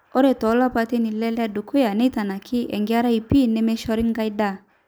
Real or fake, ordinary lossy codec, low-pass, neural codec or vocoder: real; none; none; none